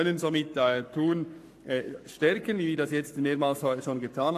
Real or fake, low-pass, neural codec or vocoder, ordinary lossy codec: fake; 14.4 kHz; codec, 44.1 kHz, 7.8 kbps, Pupu-Codec; AAC, 64 kbps